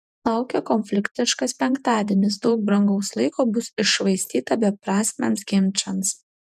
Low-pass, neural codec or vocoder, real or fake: 14.4 kHz; vocoder, 48 kHz, 128 mel bands, Vocos; fake